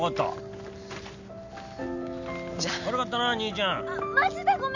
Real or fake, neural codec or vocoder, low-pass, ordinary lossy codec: real; none; 7.2 kHz; none